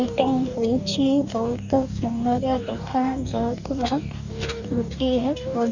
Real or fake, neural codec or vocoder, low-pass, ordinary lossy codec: fake; codec, 44.1 kHz, 2.6 kbps, DAC; 7.2 kHz; none